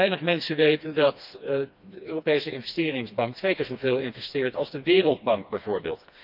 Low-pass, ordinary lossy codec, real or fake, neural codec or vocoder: 5.4 kHz; none; fake; codec, 16 kHz, 2 kbps, FreqCodec, smaller model